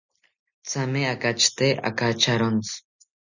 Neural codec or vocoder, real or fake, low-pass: none; real; 7.2 kHz